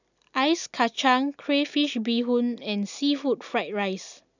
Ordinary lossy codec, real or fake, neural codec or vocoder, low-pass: none; real; none; 7.2 kHz